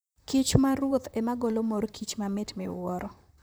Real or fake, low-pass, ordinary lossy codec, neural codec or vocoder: fake; none; none; vocoder, 44.1 kHz, 128 mel bands, Pupu-Vocoder